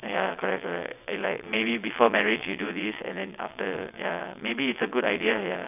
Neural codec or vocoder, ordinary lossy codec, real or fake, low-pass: vocoder, 22.05 kHz, 80 mel bands, WaveNeXt; none; fake; 3.6 kHz